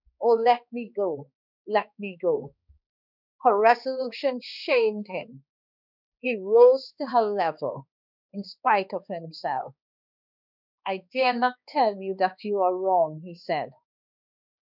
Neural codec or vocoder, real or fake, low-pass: codec, 16 kHz, 2 kbps, X-Codec, HuBERT features, trained on balanced general audio; fake; 5.4 kHz